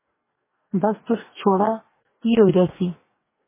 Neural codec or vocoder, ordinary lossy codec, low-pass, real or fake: codec, 44.1 kHz, 2.6 kbps, DAC; MP3, 16 kbps; 3.6 kHz; fake